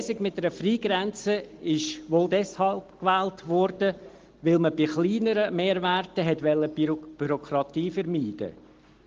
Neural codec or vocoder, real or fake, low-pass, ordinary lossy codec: none; real; 7.2 kHz; Opus, 16 kbps